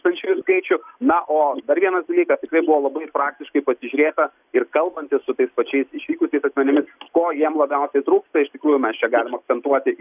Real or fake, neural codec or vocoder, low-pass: real; none; 3.6 kHz